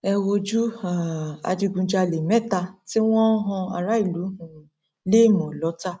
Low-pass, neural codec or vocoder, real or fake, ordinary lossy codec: none; none; real; none